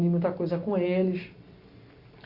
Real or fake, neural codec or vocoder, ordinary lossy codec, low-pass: real; none; Opus, 64 kbps; 5.4 kHz